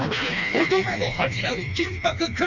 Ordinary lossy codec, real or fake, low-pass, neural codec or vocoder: none; fake; 7.2 kHz; codec, 16 kHz, 2 kbps, FreqCodec, smaller model